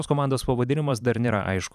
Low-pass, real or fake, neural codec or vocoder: 14.4 kHz; fake; vocoder, 44.1 kHz, 128 mel bands every 512 samples, BigVGAN v2